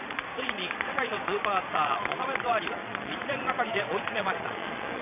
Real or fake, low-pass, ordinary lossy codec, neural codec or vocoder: fake; 3.6 kHz; none; vocoder, 22.05 kHz, 80 mel bands, Vocos